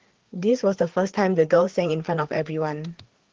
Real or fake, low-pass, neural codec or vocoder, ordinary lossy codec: fake; 7.2 kHz; codec, 16 kHz, 4 kbps, FreqCodec, larger model; Opus, 16 kbps